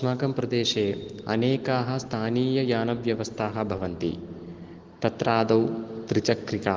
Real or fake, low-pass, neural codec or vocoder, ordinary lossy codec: real; 7.2 kHz; none; Opus, 16 kbps